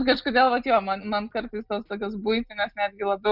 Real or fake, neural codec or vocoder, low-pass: real; none; 5.4 kHz